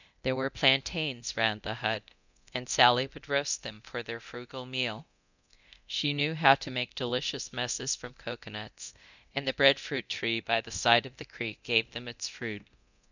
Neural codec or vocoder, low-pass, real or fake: codec, 24 kHz, 0.9 kbps, DualCodec; 7.2 kHz; fake